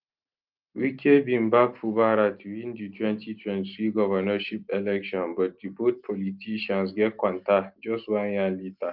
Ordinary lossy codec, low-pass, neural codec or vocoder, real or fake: Opus, 32 kbps; 5.4 kHz; none; real